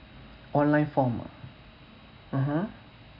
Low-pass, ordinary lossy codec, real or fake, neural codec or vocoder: 5.4 kHz; MP3, 48 kbps; real; none